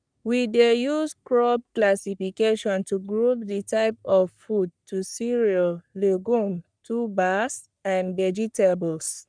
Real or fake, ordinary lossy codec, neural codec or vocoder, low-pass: fake; none; codec, 44.1 kHz, 3.4 kbps, Pupu-Codec; 9.9 kHz